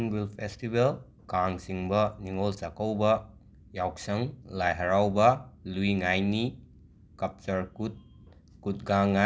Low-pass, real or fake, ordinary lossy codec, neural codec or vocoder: none; real; none; none